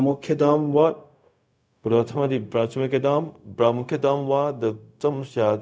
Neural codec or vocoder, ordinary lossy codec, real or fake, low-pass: codec, 16 kHz, 0.4 kbps, LongCat-Audio-Codec; none; fake; none